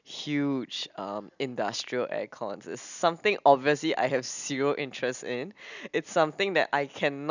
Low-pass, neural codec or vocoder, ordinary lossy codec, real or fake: 7.2 kHz; none; none; real